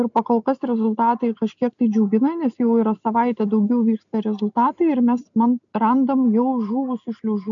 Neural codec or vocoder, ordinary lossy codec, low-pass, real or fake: none; MP3, 96 kbps; 7.2 kHz; real